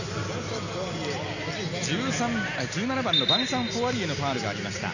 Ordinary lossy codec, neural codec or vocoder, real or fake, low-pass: none; none; real; 7.2 kHz